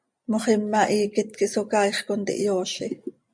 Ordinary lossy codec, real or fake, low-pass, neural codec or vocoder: MP3, 64 kbps; real; 9.9 kHz; none